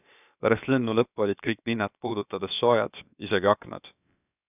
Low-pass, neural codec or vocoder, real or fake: 3.6 kHz; codec, 16 kHz, about 1 kbps, DyCAST, with the encoder's durations; fake